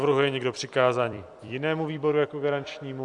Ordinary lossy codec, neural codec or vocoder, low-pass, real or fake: Opus, 64 kbps; vocoder, 44.1 kHz, 128 mel bands every 256 samples, BigVGAN v2; 10.8 kHz; fake